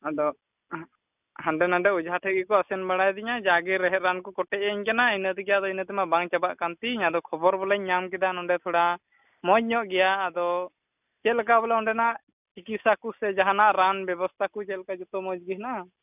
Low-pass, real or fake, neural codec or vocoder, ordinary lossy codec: 3.6 kHz; real; none; none